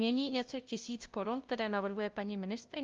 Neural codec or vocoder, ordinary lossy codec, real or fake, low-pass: codec, 16 kHz, 0.5 kbps, FunCodec, trained on LibriTTS, 25 frames a second; Opus, 32 kbps; fake; 7.2 kHz